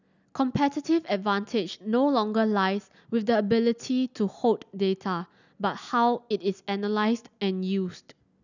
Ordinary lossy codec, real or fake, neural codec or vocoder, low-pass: none; real; none; 7.2 kHz